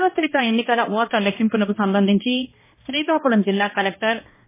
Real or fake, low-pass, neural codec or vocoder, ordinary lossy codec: fake; 3.6 kHz; codec, 16 kHz, 1 kbps, X-Codec, HuBERT features, trained on balanced general audio; MP3, 16 kbps